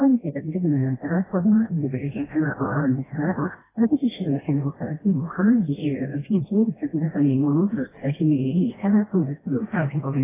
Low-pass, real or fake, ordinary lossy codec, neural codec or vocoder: 3.6 kHz; fake; AAC, 16 kbps; codec, 16 kHz, 1 kbps, FreqCodec, smaller model